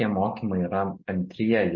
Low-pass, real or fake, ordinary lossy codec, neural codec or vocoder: 7.2 kHz; real; MP3, 32 kbps; none